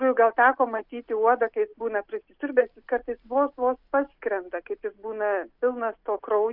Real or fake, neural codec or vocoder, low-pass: real; none; 5.4 kHz